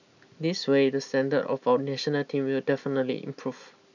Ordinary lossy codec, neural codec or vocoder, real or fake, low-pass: none; none; real; 7.2 kHz